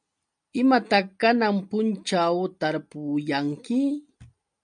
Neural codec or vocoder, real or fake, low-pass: none; real; 9.9 kHz